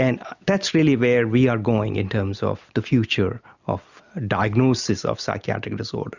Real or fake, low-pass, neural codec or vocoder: real; 7.2 kHz; none